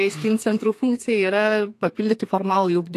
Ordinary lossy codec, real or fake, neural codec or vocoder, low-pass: AAC, 64 kbps; fake; codec, 32 kHz, 1.9 kbps, SNAC; 14.4 kHz